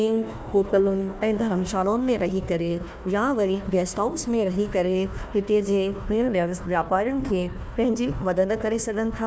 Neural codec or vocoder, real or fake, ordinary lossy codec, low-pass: codec, 16 kHz, 1 kbps, FunCodec, trained on Chinese and English, 50 frames a second; fake; none; none